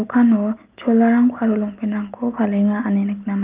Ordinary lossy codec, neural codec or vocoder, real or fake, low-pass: Opus, 32 kbps; none; real; 3.6 kHz